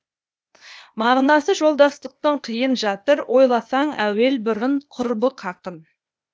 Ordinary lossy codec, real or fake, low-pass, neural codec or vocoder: none; fake; none; codec, 16 kHz, 0.8 kbps, ZipCodec